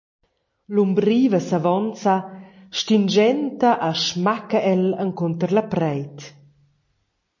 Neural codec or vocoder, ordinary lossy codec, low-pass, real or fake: none; MP3, 32 kbps; 7.2 kHz; real